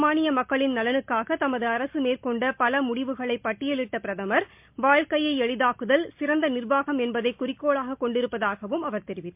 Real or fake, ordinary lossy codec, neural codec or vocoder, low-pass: real; MP3, 32 kbps; none; 3.6 kHz